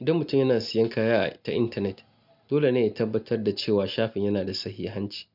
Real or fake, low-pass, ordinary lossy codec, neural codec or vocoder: real; 5.4 kHz; none; none